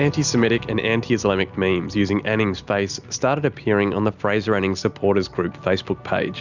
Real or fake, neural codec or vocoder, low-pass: real; none; 7.2 kHz